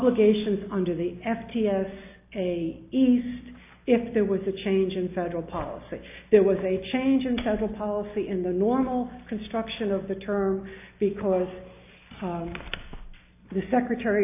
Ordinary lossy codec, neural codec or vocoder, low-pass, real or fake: AAC, 32 kbps; none; 3.6 kHz; real